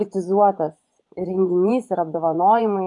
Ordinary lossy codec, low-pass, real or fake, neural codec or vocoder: AAC, 64 kbps; 10.8 kHz; fake; vocoder, 24 kHz, 100 mel bands, Vocos